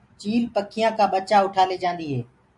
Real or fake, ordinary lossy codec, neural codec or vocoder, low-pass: real; MP3, 64 kbps; none; 10.8 kHz